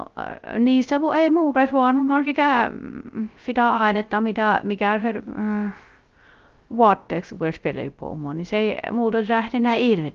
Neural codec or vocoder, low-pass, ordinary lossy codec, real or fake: codec, 16 kHz, 0.3 kbps, FocalCodec; 7.2 kHz; Opus, 32 kbps; fake